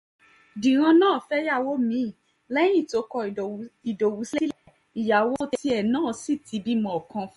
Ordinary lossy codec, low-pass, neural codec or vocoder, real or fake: MP3, 48 kbps; 19.8 kHz; none; real